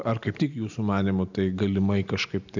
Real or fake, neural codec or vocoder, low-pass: real; none; 7.2 kHz